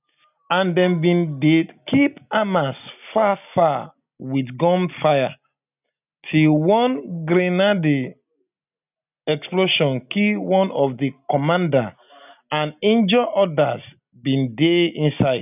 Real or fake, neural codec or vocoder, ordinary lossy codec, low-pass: real; none; none; 3.6 kHz